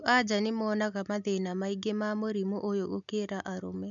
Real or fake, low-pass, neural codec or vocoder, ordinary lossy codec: real; 7.2 kHz; none; none